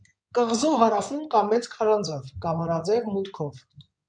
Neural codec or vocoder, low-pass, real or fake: codec, 16 kHz in and 24 kHz out, 2.2 kbps, FireRedTTS-2 codec; 9.9 kHz; fake